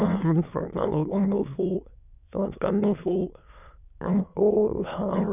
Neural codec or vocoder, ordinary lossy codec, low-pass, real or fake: autoencoder, 22.05 kHz, a latent of 192 numbers a frame, VITS, trained on many speakers; none; 3.6 kHz; fake